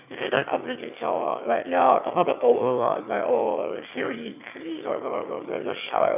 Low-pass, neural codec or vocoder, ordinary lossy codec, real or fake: 3.6 kHz; autoencoder, 22.05 kHz, a latent of 192 numbers a frame, VITS, trained on one speaker; none; fake